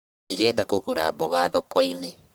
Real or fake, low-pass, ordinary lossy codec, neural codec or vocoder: fake; none; none; codec, 44.1 kHz, 1.7 kbps, Pupu-Codec